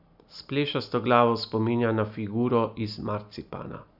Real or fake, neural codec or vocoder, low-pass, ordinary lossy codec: real; none; 5.4 kHz; none